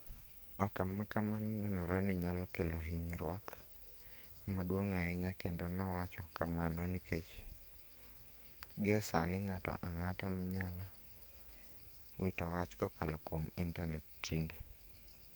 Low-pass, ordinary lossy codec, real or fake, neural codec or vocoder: none; none; fake; codec, 44.1 kHz, 2.6 kbps, SNAC